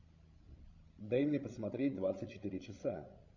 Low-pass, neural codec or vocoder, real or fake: 7.2 kHz; codec, 16 kHz, 16 kbps, FreqCodec, larger model; fake